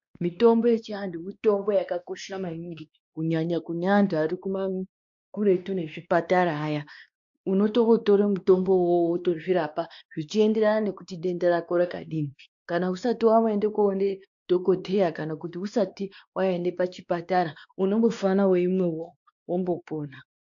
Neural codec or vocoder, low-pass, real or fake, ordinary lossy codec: codec, 16 kHz, 2 kbps, X-Codec, WavLM features, trained on Multilingual LibriSpeech; 7.2 kHz; fake; AAC, 64 kbps